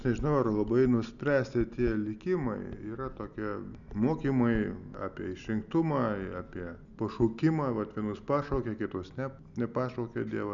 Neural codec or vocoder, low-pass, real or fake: none; 7.2 kHz; real